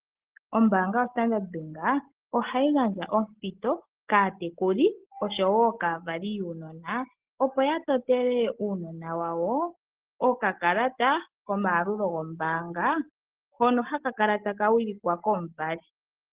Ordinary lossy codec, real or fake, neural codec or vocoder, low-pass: Opus, 16 kbps; real; none; 3.6 kHz